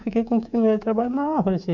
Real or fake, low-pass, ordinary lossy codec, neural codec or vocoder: fake; 7.2 kHz; none; codec, 24 kHz, 3.1 kbps, DualCodec